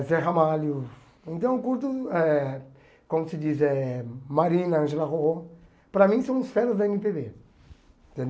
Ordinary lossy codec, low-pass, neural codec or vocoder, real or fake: none; none; none; real